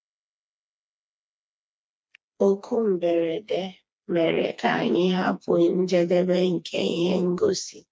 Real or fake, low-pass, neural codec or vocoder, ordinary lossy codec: fake; none; codec, 16 kHz, 2 kbps, FreqCodec, smaller model; none